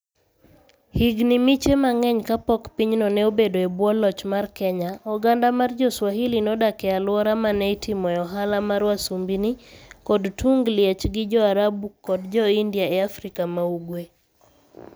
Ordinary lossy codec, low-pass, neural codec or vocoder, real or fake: none; none; none; real